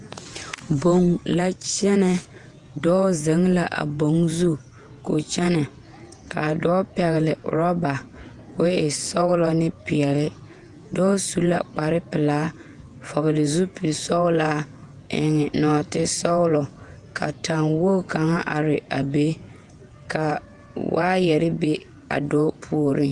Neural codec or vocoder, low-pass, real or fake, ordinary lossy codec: vocoder, 48 kHz, 128 mel bands, Vocos; 10.8 kHz; fake; Opus, 32 kbps